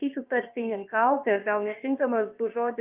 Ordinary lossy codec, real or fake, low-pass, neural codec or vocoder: Opus, 24 kbps; fake; 3.6 kHz; codec, 16 kHz, about 1 kbps, DyCAST, with the encoder's durations